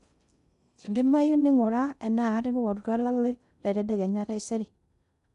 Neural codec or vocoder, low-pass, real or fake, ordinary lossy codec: codec, 16 kHz in and 24 kHz out, 0.6 kbps, FocalCodec, streaming, 2048 codes; 10.8 kHz; fake; MP3, 96 kbps